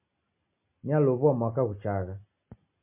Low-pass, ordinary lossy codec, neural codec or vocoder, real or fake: 3.6 kHz; MP3, 24 kbps; none; real